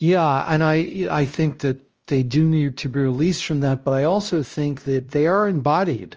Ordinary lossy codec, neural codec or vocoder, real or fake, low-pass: Opus, 24 kbps; codec, 16 kHz, 1 kbps, X-Codec, WavLM features, trained on Multilingual LibriSpeech; fake; 7.2 kHz